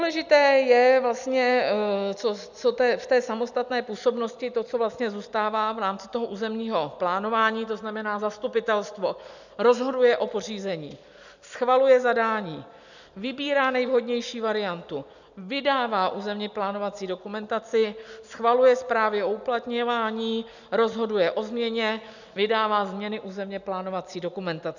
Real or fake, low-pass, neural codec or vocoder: real; 7.2 kHz; none